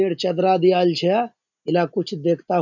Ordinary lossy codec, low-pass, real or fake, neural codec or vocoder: none; 7.2 kHz; real; none